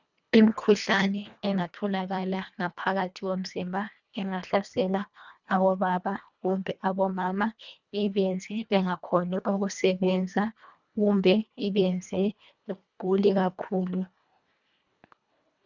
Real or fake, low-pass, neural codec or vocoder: fake; 7.2 kHz; codec, 24 kHz, 1.5 kbps, HILCodec